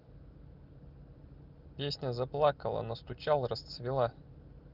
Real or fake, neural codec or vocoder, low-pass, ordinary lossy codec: real; none; 5.4 kHz; Opus, 24 kbps